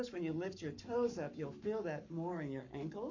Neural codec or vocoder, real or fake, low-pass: codec, 44.1 kHz, 7.8 kbps, Pupu-Codec; fake; 7.2 kHz